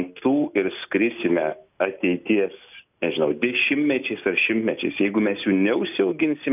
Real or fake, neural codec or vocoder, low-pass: real; none; 3.6 kHz